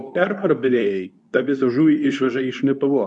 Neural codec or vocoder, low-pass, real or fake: codec, 24 kHz, 0.9 kbps, WavTokenizer, medium speech release version 2; 10.8 kHz; fake